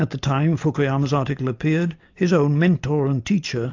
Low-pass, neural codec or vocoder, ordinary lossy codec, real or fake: 7.2 kHz; none; AAC, 48 kbps; real